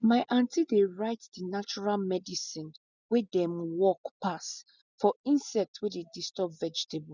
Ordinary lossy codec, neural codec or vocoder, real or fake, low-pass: none; none; real; 7.2 kHz